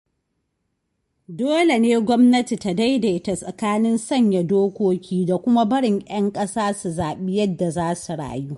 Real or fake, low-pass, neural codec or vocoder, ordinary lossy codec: real; 14.4 kHz; none; MP3, 48 kbps